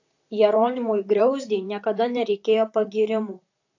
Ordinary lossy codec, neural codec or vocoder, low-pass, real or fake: AAC, 48 kbps; vocoder, 44.1 kHz, 128 mel bands, Pupu-Vocoder; 7.2 kHz; fake